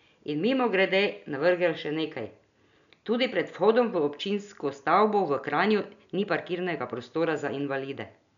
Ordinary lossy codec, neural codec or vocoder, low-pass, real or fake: none; none; 7.2 kHz; real